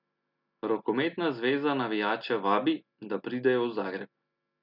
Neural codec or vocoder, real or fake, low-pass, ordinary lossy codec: none; real; 5.4 kHz; none